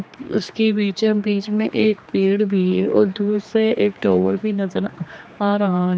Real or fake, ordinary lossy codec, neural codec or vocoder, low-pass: fake; none; codec, 16 kHz, 2 kbps, X-Codec, HuBERT features, trained on general audio; none